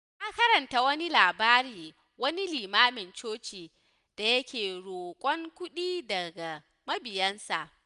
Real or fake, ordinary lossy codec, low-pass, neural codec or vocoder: real; none; 10.8 kHz; none